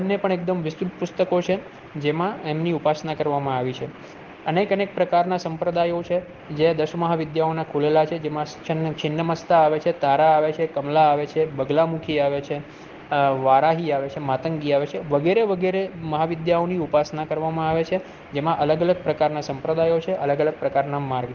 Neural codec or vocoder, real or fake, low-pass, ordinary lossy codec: none; real; 7.2 kHz; Opus, 16 kbps